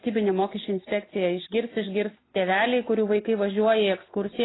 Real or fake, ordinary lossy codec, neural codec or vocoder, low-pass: real; AAC, 16 kbps; none; 7.2 kHz